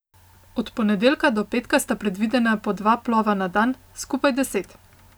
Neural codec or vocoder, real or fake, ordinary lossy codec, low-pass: none; real; none; none